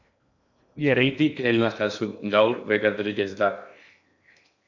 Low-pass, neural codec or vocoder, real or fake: 7.2 kHz; codec, 16 kHz in and 24 kHz out, 0.8 kbps, FocalCodec, streaming, 65536 codes; fake